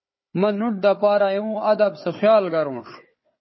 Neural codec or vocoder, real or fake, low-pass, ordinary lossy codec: codec, 16 kHz, 4 kbps, FunCodec, trained on Chinese and English, 50 frames a second; fake; 7.2 kHz; MP3, 24 kbps